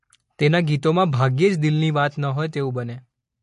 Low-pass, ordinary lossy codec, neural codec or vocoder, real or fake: 14.4 kHz; MP3, 48 kbps; none; real